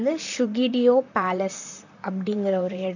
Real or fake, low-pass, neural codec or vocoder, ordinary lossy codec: fake; 7.2 kHz; vocoder, 44.1 kHz, 128 mel bands, Pupu-Vocoder; none